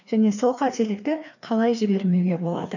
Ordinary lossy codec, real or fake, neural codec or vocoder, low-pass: none; fake; codec, 16 kHz, 2 kbps, FreqCodec, larger model; 7.2 kHz